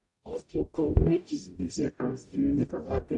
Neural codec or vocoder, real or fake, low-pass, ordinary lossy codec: codec, 44.1 kHz, 0.9 kbps, DAC; fake; 10.8 kHz; AAC, 64 kbps